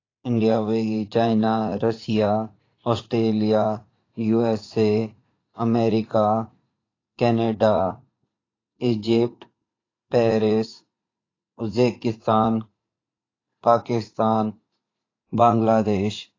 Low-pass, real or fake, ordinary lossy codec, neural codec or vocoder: 7.2 kHz; fake; AAC, 32 kbps; vocoder, 44.1 kHz, 80 mel bands, Vocos